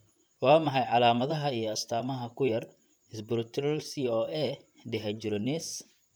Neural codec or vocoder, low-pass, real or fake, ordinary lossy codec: vocoder, 44.1 kHz, 128 mel bands, Pupu-Vocoder; none; fake; none